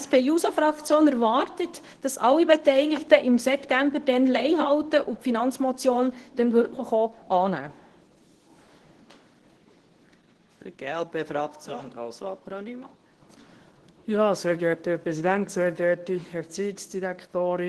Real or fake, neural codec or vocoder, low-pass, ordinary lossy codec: fake; codec, 24 kHz, 0.9 kbps, WavTokenizer, medium speech release version 2; 10.8 kHz; Opus, 16 kbps